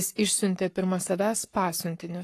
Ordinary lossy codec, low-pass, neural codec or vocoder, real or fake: AAC, 48 kbps; 14.4 kHz; codec, 44.1 kHz, 7.8 kbps, Pupu-Codec; fake